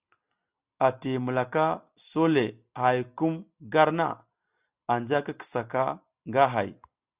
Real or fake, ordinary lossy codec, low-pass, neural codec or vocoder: real; Opus, 64 kbps; 3.6 kHz; none